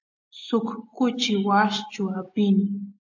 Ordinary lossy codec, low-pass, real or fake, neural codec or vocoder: AAC, 48 kbps; 7.2 kHz; real; none